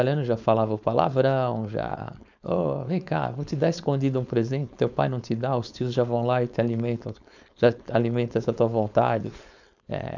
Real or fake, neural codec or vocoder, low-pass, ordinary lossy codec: fake; codec, 16 kHz, 4.8 kbps, FACodec; 7.2 kHz; none